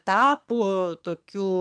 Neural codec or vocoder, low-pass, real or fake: codec, 24 kHz, 1 kbps, SNAC; 9.9 kHz; fake